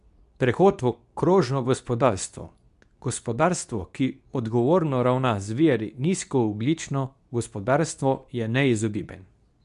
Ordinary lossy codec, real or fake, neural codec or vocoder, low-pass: none; fake; codec, 24 kHz, 0.9 kbps, WavTokenizer, medium speech release version 2; 10.8 kHz